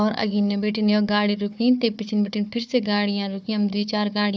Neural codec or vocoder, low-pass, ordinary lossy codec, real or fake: codec, 16 kHz, 4 kbps, FunCodec, trained on Chinese and English, 50 frames a second; none; none; fake